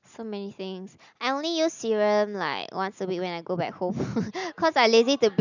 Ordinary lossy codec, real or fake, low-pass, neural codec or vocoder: none; real; 7.2 kHz; none